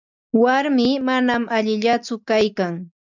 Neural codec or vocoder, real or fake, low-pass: none; real; 7.2 kHz